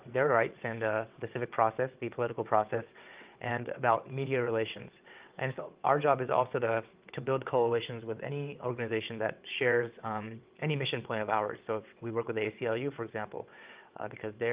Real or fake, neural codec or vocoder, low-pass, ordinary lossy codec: fake; vocoder, 22.05 kHz, 80 mel bands, WaveNeXt; 3.6 kHz; Opus, 24 kbps